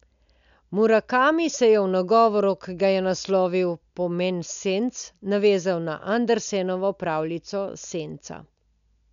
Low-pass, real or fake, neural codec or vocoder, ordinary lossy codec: 7.2 kHz; real; none; none